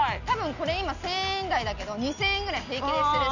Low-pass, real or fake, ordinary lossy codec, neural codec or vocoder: 7.2 kHz; real; none; none